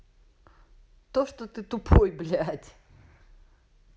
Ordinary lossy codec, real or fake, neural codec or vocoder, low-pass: none; real; none; none